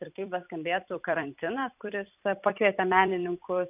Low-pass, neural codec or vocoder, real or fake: 3.6 kHz; vocoder, 44.1 kHz, 128 mel bands every 256 samples, BigVGAN v2; fake